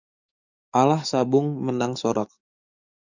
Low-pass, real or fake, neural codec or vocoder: 7.2 kHz; fake; codec, 44.1 kHz, 7.8 kbps, DAC